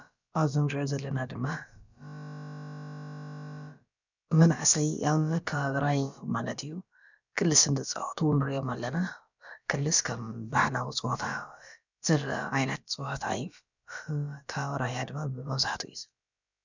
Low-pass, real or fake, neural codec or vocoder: 7.2 kHz; fake; codec, 16 kHz, about 1 kbps, DyCAST, with the encoder's durations